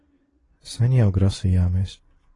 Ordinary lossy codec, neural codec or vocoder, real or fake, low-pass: AAC, 32 kbps; none; real; 10.8 kHz